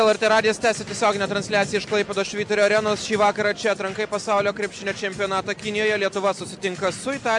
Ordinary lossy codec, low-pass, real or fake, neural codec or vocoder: MP3, 64 kbps; 10.8 kHz; real; none